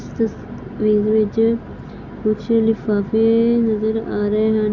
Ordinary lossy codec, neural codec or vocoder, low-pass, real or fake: none; none; 7.2 kHz; real